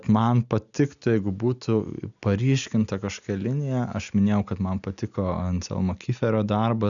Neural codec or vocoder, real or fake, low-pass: none; real; 7.2 kHz